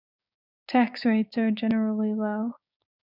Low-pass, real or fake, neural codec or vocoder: 5.4 kHz; fake; codec, 16 kHz, 6 kbps, DAC